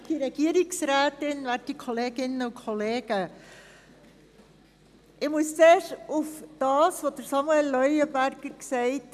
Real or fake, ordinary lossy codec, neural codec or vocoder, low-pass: real; none; none; 14.4 kHz